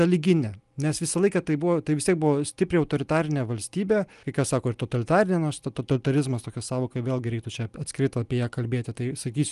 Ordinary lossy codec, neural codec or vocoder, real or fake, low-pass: Opus, 32 kbps; none; real; 10.8 kHz